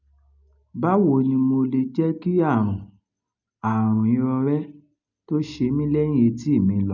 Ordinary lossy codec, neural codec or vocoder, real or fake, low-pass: none; none; real; 7.2 kHz